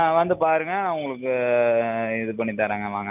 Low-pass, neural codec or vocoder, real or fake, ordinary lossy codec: 3.6 kHz; none; real; none